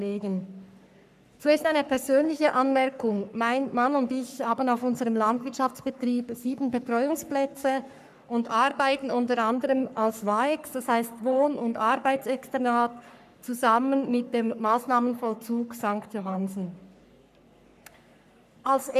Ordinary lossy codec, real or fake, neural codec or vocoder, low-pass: none; fake; codec, 44.1 kHz, 3.4 kbps, Pupu-Codec; 14.4 kHz